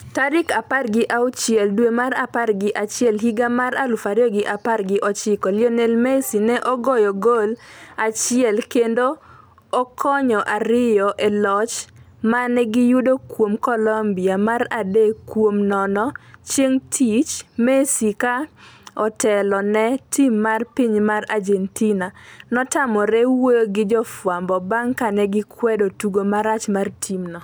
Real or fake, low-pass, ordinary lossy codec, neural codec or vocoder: real; none; none; none